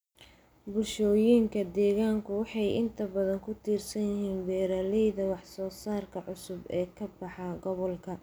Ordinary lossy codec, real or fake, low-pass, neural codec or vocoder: none; real; none; none